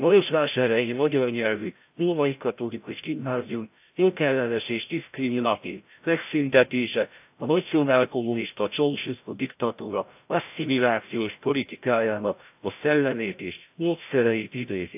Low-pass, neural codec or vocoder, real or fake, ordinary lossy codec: 3.6 kHz; codec, 16 kHz, 0.5 kbps, FreqCodec, larger model; fake; AAC, 32 kbps